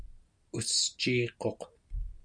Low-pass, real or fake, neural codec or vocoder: 9.9 kHz; real; none